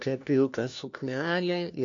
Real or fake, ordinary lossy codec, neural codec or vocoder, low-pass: fake; AAC, 48 kbps; codec, 16 kHz, 1 kbps, FunCodec, trained on LibriTTS, 50 frames a second; 7.2 kHz